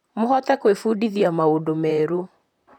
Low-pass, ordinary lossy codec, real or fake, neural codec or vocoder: 19.8 kHz; none; fake; vocoder, 44.1 kHz, 128 mel bands, Pupu-Vocoder